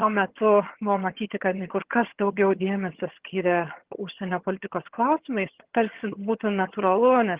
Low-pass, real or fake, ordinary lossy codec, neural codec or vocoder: 3.6 kHz; fake; Opus, 16 kbps; vocoder, 22.05 kHz, 80 mel bands, HiFi-GAN